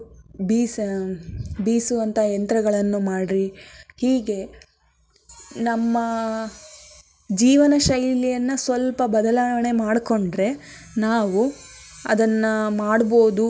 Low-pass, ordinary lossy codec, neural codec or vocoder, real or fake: none; none; none; real